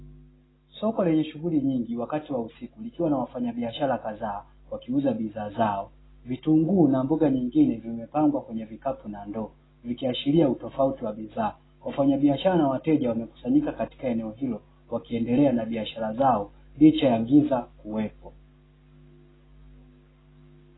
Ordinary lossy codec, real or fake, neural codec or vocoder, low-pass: AAC, 16 kbps; real; none; 7.2 kHz